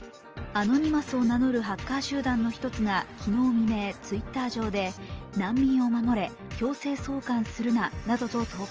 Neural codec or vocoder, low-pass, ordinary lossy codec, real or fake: none; 7.2 kHz; Opus, 24 kbps; real